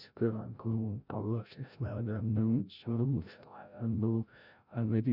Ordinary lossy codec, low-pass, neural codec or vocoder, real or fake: none; 5.4 kHz; codec, 16 kHz, 0.5 kbps, FreqCodec, larger model; fake